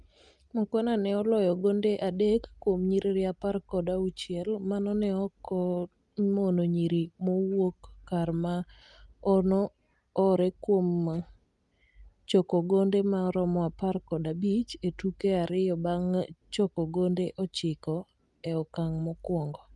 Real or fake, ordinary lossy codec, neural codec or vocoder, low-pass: real; Opus, 32 kbps; none; 10.8 kHz